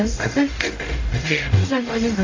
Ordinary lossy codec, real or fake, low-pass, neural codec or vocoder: AAC, 32 kbps; fake; 7.2 kHz; codec, 44.1 kHz, 0.9 kbps, DAC